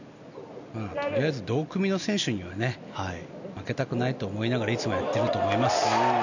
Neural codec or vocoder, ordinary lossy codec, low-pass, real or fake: none; none; 7.2 kHz; real